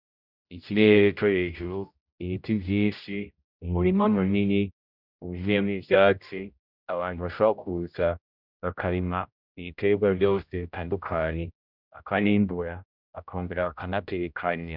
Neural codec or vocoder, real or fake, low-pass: codec, 16 kHz, 0.5 kbps, X-Codec, HuBERT features, trained on general audio; fake; 5.4 kHz